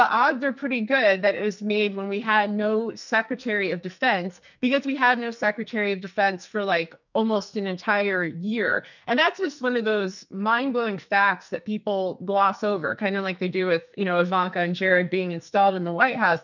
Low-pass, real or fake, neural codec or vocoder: 7.2 kHz; fake; codec, 44.1 kHz, 2.6 kbps, SNAC